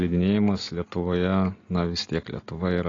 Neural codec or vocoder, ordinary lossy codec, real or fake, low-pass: none; AAC, 32 kbps; real; 7.2 kHz